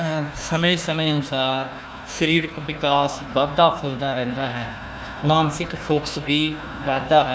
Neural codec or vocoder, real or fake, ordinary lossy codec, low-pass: codec, 16 kHz, 1 kbps, FunCodec, trained on Chinese and English, 50 frames a second; fake; none; none